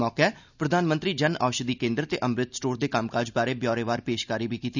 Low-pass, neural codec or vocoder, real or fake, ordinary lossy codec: 7.2 kHz; none; real; none